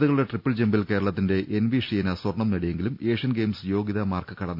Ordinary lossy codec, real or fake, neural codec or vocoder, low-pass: none; real; none; 5.4 kHz